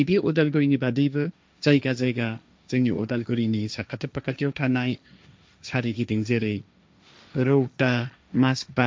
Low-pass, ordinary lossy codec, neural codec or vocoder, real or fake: none; none; codec, 16 kHz, 1.1 kbps, Voila-Tokenizer; fake